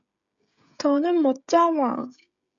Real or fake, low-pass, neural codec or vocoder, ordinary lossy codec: fake; 7.2 kHz; codec, 16 kHz, 16 kbps, FreqCodec, smaller model; AAC, 64 kbps